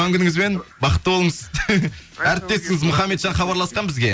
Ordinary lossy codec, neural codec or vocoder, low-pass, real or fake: none; none; none; real